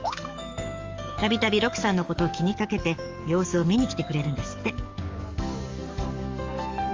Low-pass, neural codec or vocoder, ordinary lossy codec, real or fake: 7.2 kHz; codec, 44.1 kHz, 7.8 kbps, DAC; Opus, 32 kbps; fake